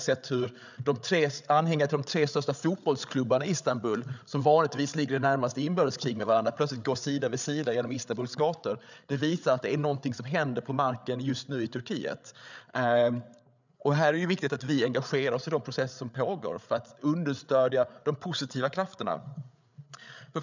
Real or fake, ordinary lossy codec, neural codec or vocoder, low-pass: fake; none; codec, 16 kHz, 8 kbps, FreqCodec, larger model; 7.2 kHz